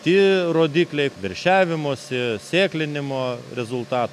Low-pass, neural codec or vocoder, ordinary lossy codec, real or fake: 14.4 kHz; none; MP3, 96 kbps; real